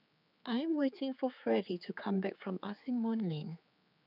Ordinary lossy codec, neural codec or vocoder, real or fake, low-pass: none; codec, 16 kHz, 4 kbps, X-Codec, HuBERT features, trained on general audio; fake; 5.4 kHz